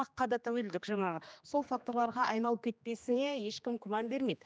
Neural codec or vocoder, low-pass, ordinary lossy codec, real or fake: codec, 16 kHz, 2 kbps, X-Codec, HuBERT features, trained on general audio; none; none; fake